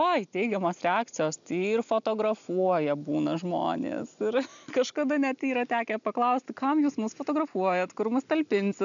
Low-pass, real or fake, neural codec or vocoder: 7.2 kHz; real; none